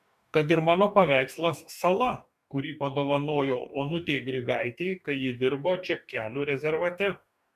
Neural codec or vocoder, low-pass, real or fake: codec, 44.1 kHz, 2.6 kbps, DAC; 14.4 kHz; fake